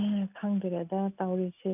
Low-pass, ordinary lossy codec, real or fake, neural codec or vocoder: 3.6 kHz; none; real; none